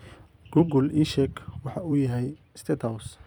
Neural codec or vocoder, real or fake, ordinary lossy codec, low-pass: vocoder, 44.1 kHz, 128 mel bands every 256 samples, BigVGAN v2; fake; none; none